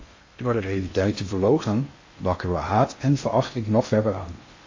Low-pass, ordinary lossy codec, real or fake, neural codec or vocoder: 7.2 kHz; MP3, 32 kbps; fake; codec, 16 kHz in and 24 kHz out, 0.6 kbps, FocalCodec, streaming, 2048 codes